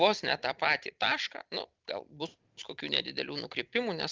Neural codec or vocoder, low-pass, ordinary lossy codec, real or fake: none; 7.2 kHz; Opus, 32 kbps; real